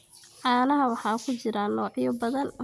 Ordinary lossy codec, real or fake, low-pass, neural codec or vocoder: none; real; none; none